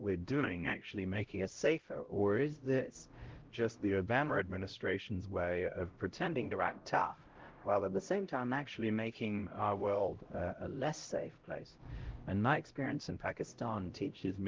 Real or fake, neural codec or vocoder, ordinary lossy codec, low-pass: fake; codec, 16 kHz, 0.5 kbps, X-Codec, HuBERT features, trained on LibriSpeech; Opus, 16 kbps; 7.2 kHz